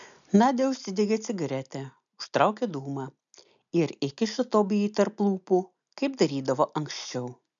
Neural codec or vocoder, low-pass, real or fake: none; 7.2 kHz; real